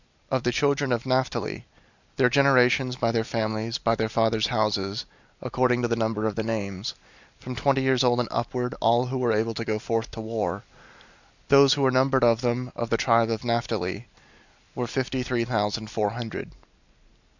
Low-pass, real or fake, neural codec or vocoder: 7.2 kHz; real; none